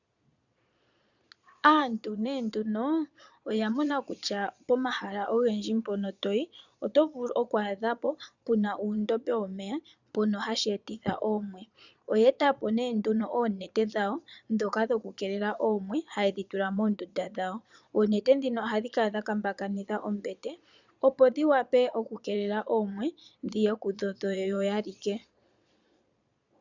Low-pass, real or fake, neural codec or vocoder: 7.2 kHz; fake; vocoder, 22.05 kHz, 80 mel bands, Vocos